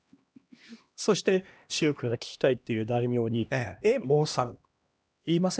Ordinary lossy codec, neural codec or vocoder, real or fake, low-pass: none; codec, 16 kHz, 1 kbps, X-Codec, HuBERT features, trained on LibriSpeech; fake; none